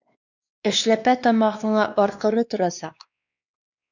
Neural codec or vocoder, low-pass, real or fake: codec, 16 kHz, 2 kbps, X-Codec, WavLM features, trained on Multilingual LibriSpeech; 7.2 kHz; fake